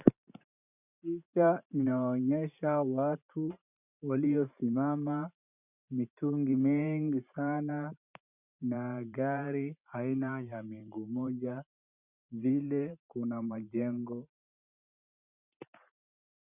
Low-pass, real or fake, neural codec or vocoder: 3.6 kHz; fake; vocoder, 44.1 kHz, 128 mel bands every 512 samples, BigVGAN v2